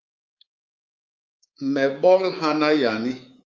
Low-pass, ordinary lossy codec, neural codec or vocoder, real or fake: 7.2 kHz; Opus, 32 kbps; codec, 24 kHz, 3.1 kbps, DualCodec; fake